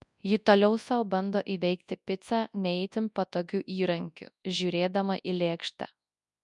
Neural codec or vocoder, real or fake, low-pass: codec, 24 kHz, 0.9 kbps, WavTokenizer, large speech release; fake; 10.8 kHz